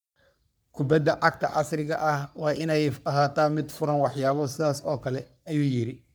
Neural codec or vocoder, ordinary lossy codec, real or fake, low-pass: codec, 44.1 kHz, 3.4 kbps, Pupu-Codec; none; fake; none